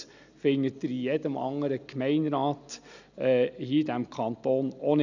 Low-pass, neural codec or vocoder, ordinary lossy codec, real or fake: 7.2 kHz; none; none; real